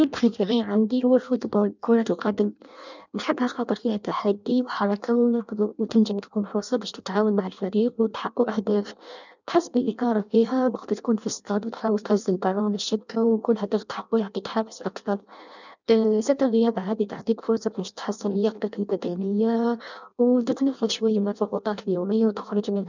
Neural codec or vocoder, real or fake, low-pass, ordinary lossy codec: codec, 16 kHz in and 24 kHz out, 0.6 kbps, FireRedTTS-2 codec; fake; 7.2 kHz; none